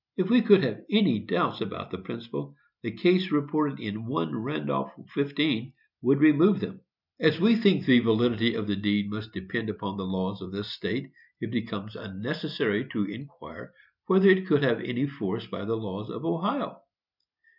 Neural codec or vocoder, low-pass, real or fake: none; 5.4 kHz; real